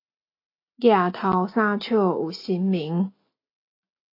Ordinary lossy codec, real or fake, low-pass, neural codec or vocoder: AAC, 32 kbps; real; 5.4 kHz; none